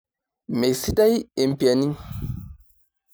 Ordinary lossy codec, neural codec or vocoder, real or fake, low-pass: none; none; real; none